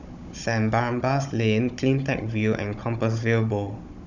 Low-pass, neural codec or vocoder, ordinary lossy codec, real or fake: 7.2 kHz; codec, 16 kHz, 16 kbps, FunCodec, trained on Chinese and English, 50 frames a second; none; fake